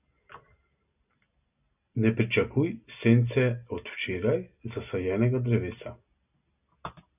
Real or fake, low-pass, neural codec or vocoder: real; 3.6 kHz; none